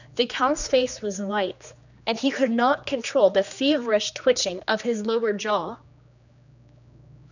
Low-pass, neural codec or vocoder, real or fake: 7.2 kHz; codec, 16 kHz, 2 kbps, X-Codec, HuBERT features, trained on general audio; fake